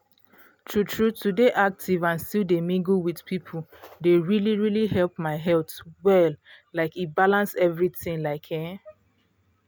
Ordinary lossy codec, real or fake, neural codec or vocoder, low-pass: none; real; none; none